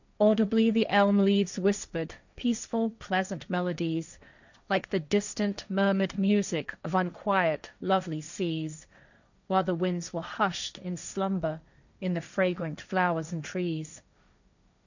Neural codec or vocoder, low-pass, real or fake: codec, 16 kHz, 1.1 kbps, Voila-Tokenizer; 7.2 kHz; fake